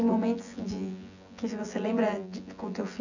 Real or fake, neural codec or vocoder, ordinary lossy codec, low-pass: fake; vocoder, 24 kHz, 100 mel bands, Vocos; none; 7.2 kHz